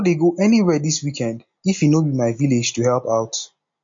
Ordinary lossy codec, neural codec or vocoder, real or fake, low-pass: MP3, 48 kbps; none; real; 7.2 kHz